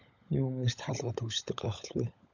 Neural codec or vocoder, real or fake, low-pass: codec, 16 kHz, 16 kbps, FunCodec, trained on LibriTTS, 50 frames a second; fake; 7.2 kHz